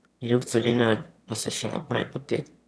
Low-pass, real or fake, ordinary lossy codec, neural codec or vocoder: none; fake; none; autoencoder, 22.05 kHz, a latent of 192 numbers a frame, VITS, trained on one speaker